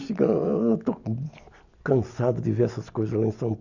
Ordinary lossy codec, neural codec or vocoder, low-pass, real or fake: none; none; 7.2 kHz; real